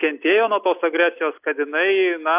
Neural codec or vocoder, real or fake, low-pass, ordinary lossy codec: none; real; 3.6 kHz; AAC, 32 kbps